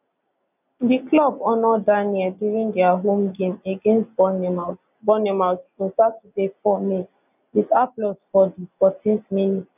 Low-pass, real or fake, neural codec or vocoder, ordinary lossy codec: 3.6 kHz; real; none; none